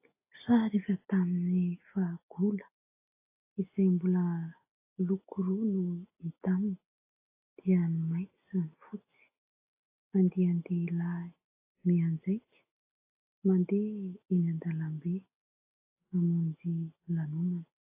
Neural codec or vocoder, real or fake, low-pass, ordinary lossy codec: none; real; 3.6 kHz; AAC, 32 kbps